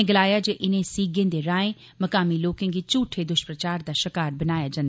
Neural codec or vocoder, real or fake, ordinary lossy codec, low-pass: none; real; none; none